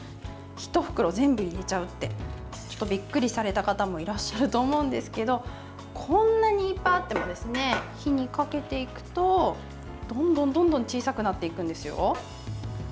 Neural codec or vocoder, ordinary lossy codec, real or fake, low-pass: none; none; real; none